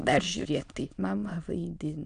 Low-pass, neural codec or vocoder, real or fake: 9.9 kHz; autoencoder, 22.05 kHz, a latent of 192 numbers a frame, VITS, trained on many speakers; fake